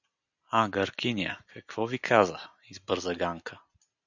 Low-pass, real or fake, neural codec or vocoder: 7.2 kHz; real; none